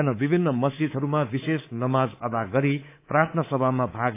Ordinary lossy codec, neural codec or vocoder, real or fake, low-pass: AAC, 24 kbps; codec, 24 kHz, 3.1 kbps, DualCodec; fake; 3.6 kHz